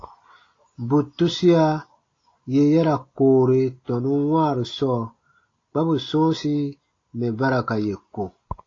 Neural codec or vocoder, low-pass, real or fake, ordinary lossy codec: none; 7.2 kHz; real; AAC, 32 kbps